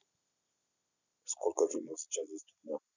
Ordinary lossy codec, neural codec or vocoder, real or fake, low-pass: none; none; real; 7.2 kHz